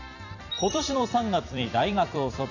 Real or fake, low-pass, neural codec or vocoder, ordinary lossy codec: fake; 7.2 kHz; vocoder, 44.1 kHz, 128 mel bands every 256 samples, BigVGAN v2; none